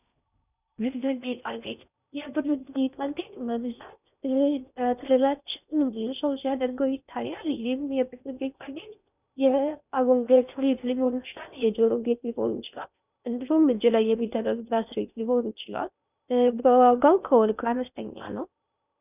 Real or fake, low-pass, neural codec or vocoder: fake; 3.6 kHz; codec, 16 kHz in and 24 kHz out, 0.6 kbps, FocalCodec, streaming, 4096 codes